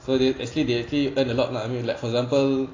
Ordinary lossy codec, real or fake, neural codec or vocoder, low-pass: AAC, 32 kbps; real; none; 7.2 kHz